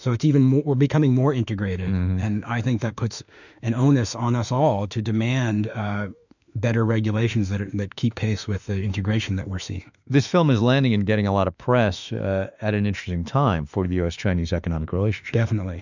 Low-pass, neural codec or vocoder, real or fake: 7.2 kHz; autoencoder, 48 kHz, 32 numbers a frame, DAC-VAE, trained on Japanese speech; fake